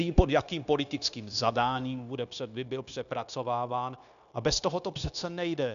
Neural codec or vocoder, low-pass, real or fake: codec, 16 kHz, 0.9 kbps, LongCat-Audio-Codec; 7.2 kHz; fake